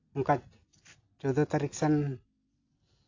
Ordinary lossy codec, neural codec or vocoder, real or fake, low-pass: AAC, 48 kbps; none; real; 7.2 kHz